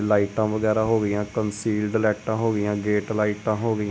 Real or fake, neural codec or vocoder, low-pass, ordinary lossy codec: real; none; none; none